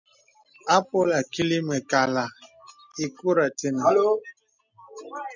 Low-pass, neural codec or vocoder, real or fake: 7.2 kHz; none; real